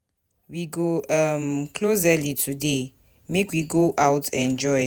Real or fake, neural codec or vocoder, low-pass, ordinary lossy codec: fake; vocoder, 48 kHz, 128 mel bands, Vocos; none; none